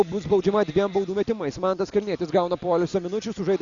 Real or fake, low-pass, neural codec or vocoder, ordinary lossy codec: real; 7.2 kHz; none; Opus, 64 kbps